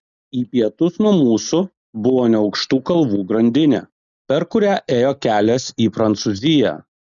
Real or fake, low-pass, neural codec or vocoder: real; 7.2 kHz; none